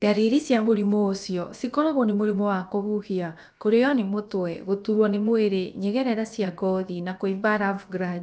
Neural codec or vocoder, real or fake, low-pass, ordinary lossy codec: codec, 16 kHz, about 1 kbps, DyCAST, with the encoder's durations; fake; none; none